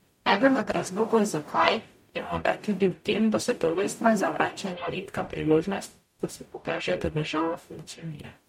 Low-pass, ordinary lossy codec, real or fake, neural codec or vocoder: 19.8 kHz; MP3, 64 kbps; fake; codec, 44.1 kHz, 0.9 kbps, DAC